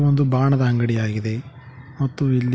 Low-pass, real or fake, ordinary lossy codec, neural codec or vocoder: none; real; none; none